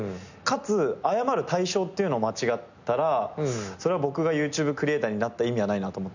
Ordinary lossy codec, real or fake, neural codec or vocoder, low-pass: none; real; none; 7.2 kHz